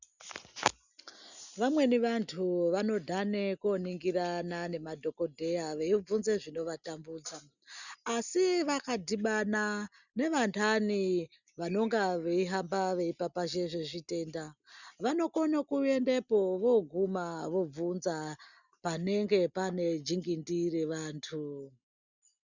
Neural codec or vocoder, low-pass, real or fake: none; 7.2 kHz; real